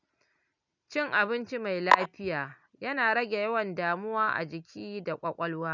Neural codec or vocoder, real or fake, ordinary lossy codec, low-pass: none; real; none; 7.2 kHz